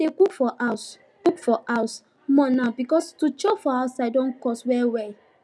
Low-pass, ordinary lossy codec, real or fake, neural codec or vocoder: none; none; real; none